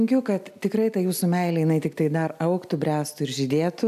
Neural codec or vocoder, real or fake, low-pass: none; real; 14.4 kHz